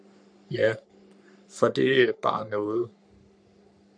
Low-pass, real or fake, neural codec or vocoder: 9.9 kHz; fake; codec, 44.1 kHz, 3.4 kbps, Pupu-Codec